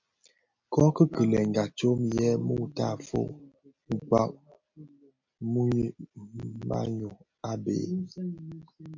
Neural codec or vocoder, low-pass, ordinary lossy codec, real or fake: none; 7.2 kHz; MP3, 64 kbps; real